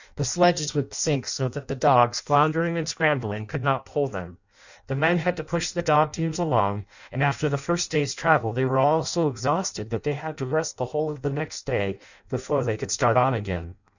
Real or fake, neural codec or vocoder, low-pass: fake; codec, 16 kHz in and 24 kHz out, 0.6 kbps, FireRedTTS-2 codec; 7.2 kHz